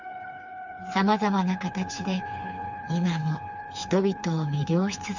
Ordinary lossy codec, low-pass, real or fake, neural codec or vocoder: none; 7.2 kHz; fake; codec, 16 kHz, 4 kbps, FreqCodec, smaller model